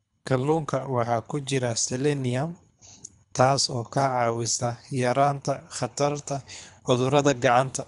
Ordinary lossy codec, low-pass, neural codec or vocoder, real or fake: none; 10.8 kHz; codec, 24 kHz, 3 kbps, HILCodec; fake